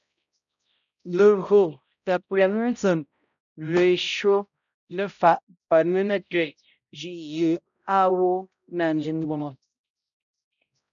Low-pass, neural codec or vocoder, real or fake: 7.2 kHz; codec, 16 kHz, 0.5 kbps, X-Codec, HuBERT features, trained on balanced general audio; fake